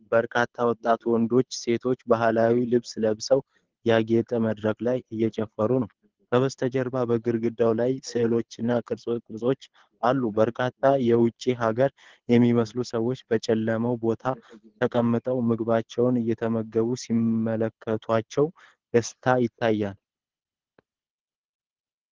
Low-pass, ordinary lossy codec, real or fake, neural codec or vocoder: 7.2 kHz; Opus, 16 kbps; fake; codec, 24 kHz, 6 kbps, HILCodec